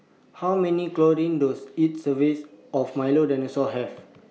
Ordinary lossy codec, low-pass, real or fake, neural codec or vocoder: none; none; real; none